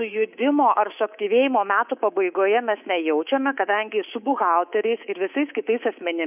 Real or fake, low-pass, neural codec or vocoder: fake; 3.6 kHz; codec, 24 kHz, 3.1 kbps, DualCodec